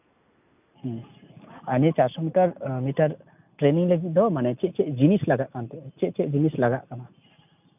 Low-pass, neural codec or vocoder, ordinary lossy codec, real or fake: 3.6 kHz; none; none; real